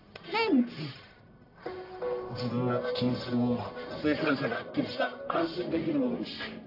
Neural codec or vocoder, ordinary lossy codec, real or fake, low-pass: codec, 44.1 kHz, 1.7 kbps, Pupu-Codec; AAC, 32 kbps; fake; 5.4 kHz